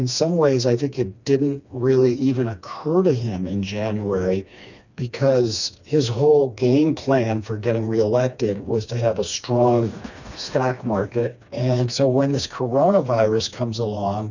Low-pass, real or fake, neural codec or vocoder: 7.2 kHz; fake; codec, 16 kHz, 2 kbps, FreqCodec, smaller model